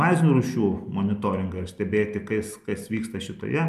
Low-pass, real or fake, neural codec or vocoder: 14.4 kHz; real; none